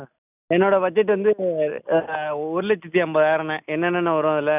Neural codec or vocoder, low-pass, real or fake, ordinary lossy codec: none; 3.6 kHz; real; none